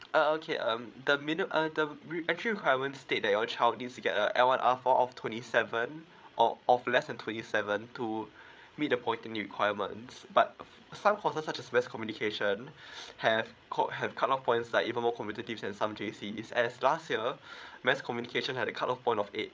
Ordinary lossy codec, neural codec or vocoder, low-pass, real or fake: none; codec, 16 kHz, 8 kbps, FreqCodec, larger model; none; fake